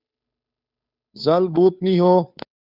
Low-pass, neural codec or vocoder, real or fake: 5.4 kHz; codec, 16 kHz, 2 kbps, FunCodec, trained on Chinese and English, 25 frames a second; fake